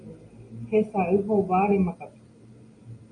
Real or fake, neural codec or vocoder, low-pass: real; none; 9.9 kHz